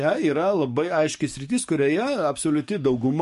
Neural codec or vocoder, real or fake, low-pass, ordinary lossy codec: codec, 44.1 kHz, 7.8 kbps, DAC; fake; 14.4 kHz; MP3, 48 kbps